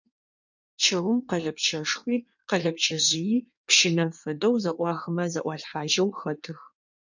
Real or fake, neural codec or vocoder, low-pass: fake; codec, 16 kHz in and 24 kHz out, 1.1 kbps, FireRedTTS-2 codec; 7.2 kHz